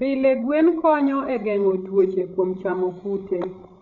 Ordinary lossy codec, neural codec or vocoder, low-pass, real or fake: none; codec, 16 kHz, 16 kbps, FreqCodec, larger model; 7.2 kHz; fake